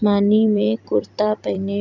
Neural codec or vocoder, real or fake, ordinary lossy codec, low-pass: vocoder, 44.1 kHz, 128 mel bands every 256 samples, BigVGAN v2; fake; none; 7.2 kHz